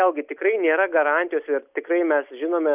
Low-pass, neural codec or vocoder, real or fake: 3.6 kHz; none; real